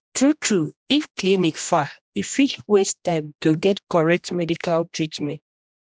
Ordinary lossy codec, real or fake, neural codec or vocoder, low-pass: none; fake; codec, 16 kHz, 1 kbps, X-Codec, HuBERT features, trained on general audio; none